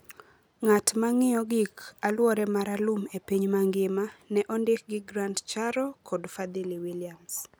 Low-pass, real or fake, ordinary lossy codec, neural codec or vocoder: none; real; none; none